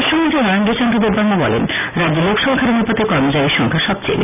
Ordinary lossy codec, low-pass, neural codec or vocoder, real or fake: none; 3.6 kHz; none; real